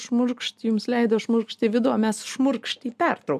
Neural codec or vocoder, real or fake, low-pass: none; real; 14.4 kHz